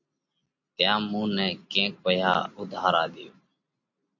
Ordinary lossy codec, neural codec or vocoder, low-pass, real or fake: MP3, 48 kbps; none; 7.2 kHz; real